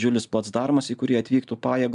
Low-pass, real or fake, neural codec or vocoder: 10.8 kHz; real; none